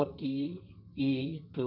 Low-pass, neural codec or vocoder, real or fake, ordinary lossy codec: 5.4 kHz; codec, 32 kHz, 1.9 kbps, SNAC; fake; none